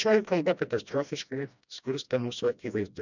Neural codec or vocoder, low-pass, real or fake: codec, 16 kHz, 1 kbps, FreqCodec, smaller model; 7.2 kHz; fake